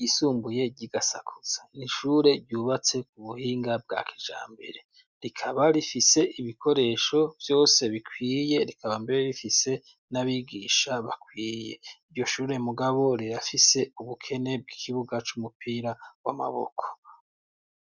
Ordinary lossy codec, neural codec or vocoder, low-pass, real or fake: Opus, 64 kbps; none; 7.2 kHz; real